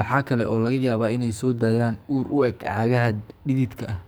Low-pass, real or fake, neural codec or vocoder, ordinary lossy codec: none; fake; codec, 44.1 kHz, 2.6 kbps, SNAC; none